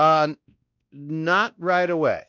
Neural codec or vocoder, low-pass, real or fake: codec, 16 kHz, 1 kbps, X-Codec, WavLM features, trained on Multilingual LibriSpeech; 7.2 kHz; fake